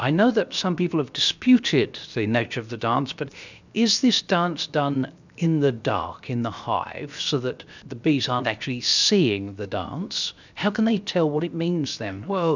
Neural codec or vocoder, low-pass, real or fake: codec, 16 kHz, 0.7 kbps, FocalCodec; 7.2 kHz; fake